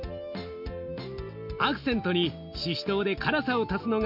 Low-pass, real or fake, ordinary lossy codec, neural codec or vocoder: 5.4 kHz; real; MP3, 48 kbps; none